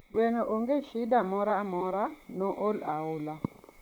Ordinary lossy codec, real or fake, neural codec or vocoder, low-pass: none; fake; vocoder, 44.1 kHz, 128 mel bands, Pupu-Vocoder; none